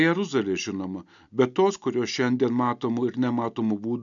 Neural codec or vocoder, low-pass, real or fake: none; 7.2 kHz; real